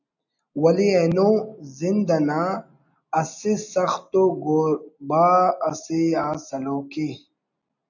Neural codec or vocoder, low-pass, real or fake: none; 7.2 kHz; real